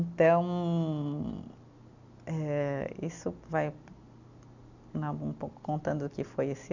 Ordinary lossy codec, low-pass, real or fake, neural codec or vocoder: none; 7.2 kHz; real; none